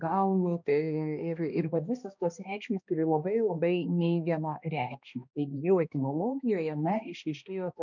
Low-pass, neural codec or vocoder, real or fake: 7.2 kHz; codec, 16 kHz, 1 kbps, X-Codec, HuBERT features, trained on balanced general audio; fake